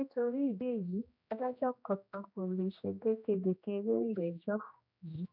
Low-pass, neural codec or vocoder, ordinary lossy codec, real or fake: 5.4 kHz; codec, 16 kHz, 1 kbps, X-Codec, HuBERT features, trained on general audio; none; fake